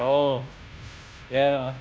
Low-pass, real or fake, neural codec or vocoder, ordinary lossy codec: none; fake; codec, 16 kHz, 0.5 kbps, FunCodec, trained on Chinese and English, 25 frames a second; none